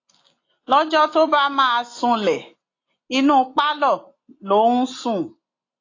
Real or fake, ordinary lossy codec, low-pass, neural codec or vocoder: real; AAC, 32 kbps; 7.2 kHz; none